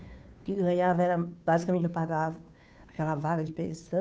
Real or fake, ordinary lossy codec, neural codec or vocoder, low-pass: fake; none; codec, 16 kHz, 2 kbps, FunCodec, trained on Chinese and English, 25 frames a second; none